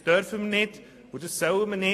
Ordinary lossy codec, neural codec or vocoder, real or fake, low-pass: AAC, 48 kbps; none; real; 14.4 kHz